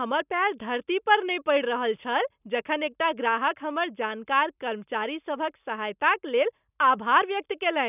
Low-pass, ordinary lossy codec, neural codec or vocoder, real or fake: 3.6 kHz; none; none; real